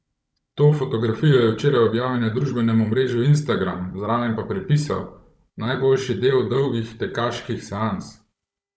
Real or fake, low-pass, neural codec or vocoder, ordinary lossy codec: fake; none; codec, 16 kHz, 16 kbps, FunCodec, trained on Chinese and English, 50 frames a second; none